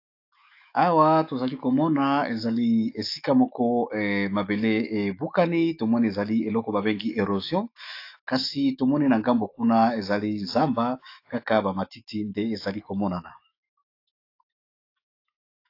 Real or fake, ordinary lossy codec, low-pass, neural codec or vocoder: fake; AAC, 32 kbps; 5.4 kHz; autoencoder, 48 kHz, 128 numbers a frame, DAC-VAE, trained on Japanese speech